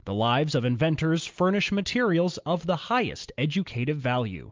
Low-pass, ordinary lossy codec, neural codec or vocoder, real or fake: 7.2 kHz; Opus, 32 kbps; none; real